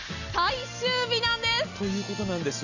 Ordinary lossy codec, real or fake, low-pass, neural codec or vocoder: none; real; 7.2 kHz; none